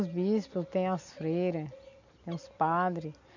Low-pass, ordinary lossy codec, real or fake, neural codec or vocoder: 7.2 kHz; none; real; none